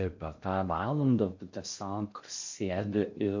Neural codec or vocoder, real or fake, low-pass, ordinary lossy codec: codec, 16 kHz in and 24 kHz out, 0.6 kbps, FocalCodec, streaming, 4096 codes; fake; 7.2 kHz; MP3, 48 kbps